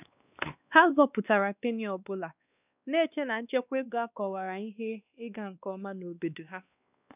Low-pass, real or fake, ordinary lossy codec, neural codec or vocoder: 3.6 kHz; fake; none; codec, 16 kHz, 2 kbps, X-Codec, WavLM features, trained on Multilingual LibriSpeech